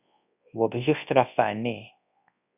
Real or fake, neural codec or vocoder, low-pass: fake; codec, 24 kHz, 0.9 kbps, WavTokenizer, large speech release; 3.6 kHz